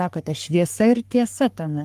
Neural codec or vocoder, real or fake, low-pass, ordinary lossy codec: codec, 44.1 kHz, 3.4 kbps, Pupu-Codec; fake; 14.4 kHz; Opus, 32 kbps